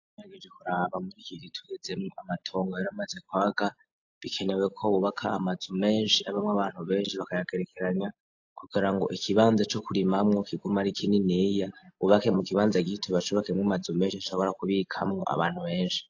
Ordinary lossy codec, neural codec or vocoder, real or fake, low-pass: AAC, 48 kbps; none; real; 7.2 kHz